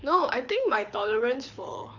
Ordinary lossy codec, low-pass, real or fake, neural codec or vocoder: none; 7.2 kHz; fake; codec, 24 kHz, 6 kbps, HILCodec